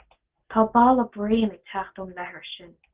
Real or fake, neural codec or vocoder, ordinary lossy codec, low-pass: real; none; Opus, 16 kbps; 3.6 kHz